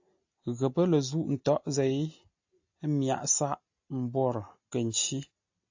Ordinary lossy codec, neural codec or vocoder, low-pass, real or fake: AAC, 48 kbps; none; 7.2 kHz; real